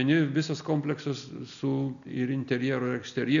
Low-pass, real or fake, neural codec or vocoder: 7.2 kHz; real; none